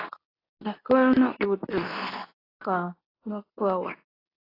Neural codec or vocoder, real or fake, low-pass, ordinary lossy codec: codec, 24 kHz, 0.9 kbps, WavTokenizer, medium speech release version 2; fake; 5.4 kHz; AAC, 24 kbps